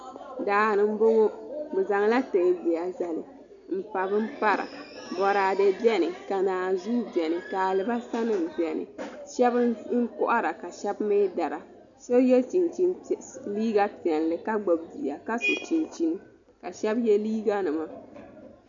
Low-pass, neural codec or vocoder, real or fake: 7.2 kHz; none; real